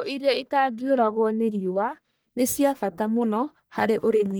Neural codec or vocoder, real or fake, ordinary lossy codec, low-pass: codec, 44.1 kHz, 1.7 kbps, Pupu-Codec; fake; none; none